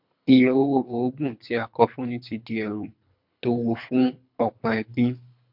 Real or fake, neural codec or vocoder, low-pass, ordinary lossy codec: fake; codec, 24 kHz, 3 kbps, HILCodec; 5.4 kHz; MP3, 48 kbps